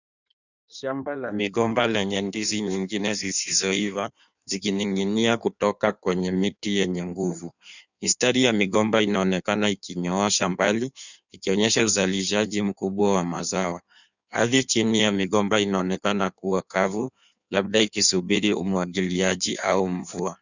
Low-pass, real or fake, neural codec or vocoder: 7.2 kHz; fake; codec, 16 kHz in and 24 kHz out, 1.1 kbps, FireRedTTS-2 codec